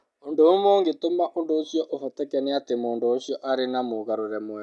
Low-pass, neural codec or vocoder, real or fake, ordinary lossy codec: none; none; real; none